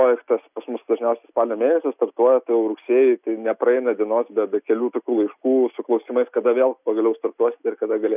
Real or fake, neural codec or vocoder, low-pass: real; none; 3.6 kHz